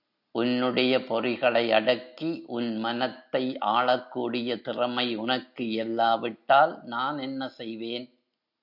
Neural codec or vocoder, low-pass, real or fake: none; 5.4 kHz; real